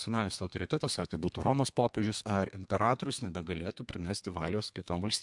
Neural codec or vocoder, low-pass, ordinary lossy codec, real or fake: codec, 32 kHz, 1.9 kbps, SNAC; 10.8 kHz; MP3, 64 kbps; fake